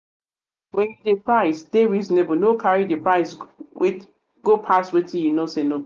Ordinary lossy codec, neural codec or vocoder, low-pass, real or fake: Opus, 24 kbps; none; 7.2 kHz; real